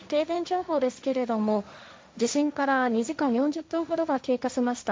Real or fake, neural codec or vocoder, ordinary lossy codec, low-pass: fake; codec, 16 kHz, 1.1 kbps, Voila-Tokenizer; none; none